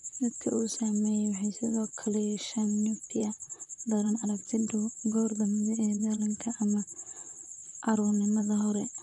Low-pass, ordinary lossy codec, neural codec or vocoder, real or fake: 10.8 kHz; none; vocoder, 44.1 kHz, 128 mel bands, Pupu-Vocoder; fake